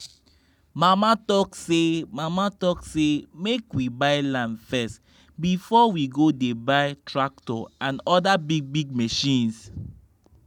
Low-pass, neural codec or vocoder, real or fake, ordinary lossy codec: 19.8 kHz; none; real; none